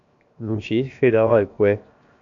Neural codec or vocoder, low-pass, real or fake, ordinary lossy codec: codec, 16 kHz, 0.7 kbps, FocalCodec; 7.2 kHz; fake; AAC, 64 kbps